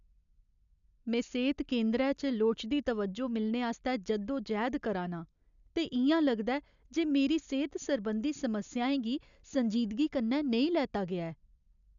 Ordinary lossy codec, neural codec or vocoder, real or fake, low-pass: none; none; real; 7.2 kHz